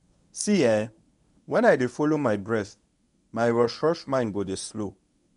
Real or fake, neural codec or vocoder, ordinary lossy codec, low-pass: fake; codec, 24 kHz, 0.9 kbps, WavTokenizer, medium speech release version 1; none; 10.8 kHz